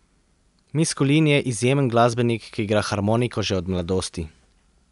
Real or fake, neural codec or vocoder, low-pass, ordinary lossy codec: real; none; 10.8 kHz; none